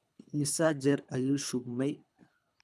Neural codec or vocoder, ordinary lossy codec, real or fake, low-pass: codec, 24 kHz, 3 kbps, HILCodec; none; fake; none